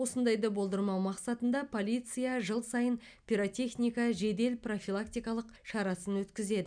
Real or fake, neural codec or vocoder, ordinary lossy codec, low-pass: real; none; none; 9.9 kHz